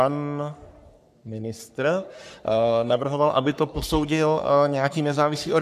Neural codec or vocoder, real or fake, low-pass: codec, 44.1 kHz, 3.4 kbps, Pupu-Codec; fake; 14.4 kHz